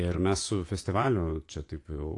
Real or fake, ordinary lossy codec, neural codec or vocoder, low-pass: fake; AAC, 48 kbps; vocoder, 24 kHz, 100 mel bands, Vocos; 10.8 kHz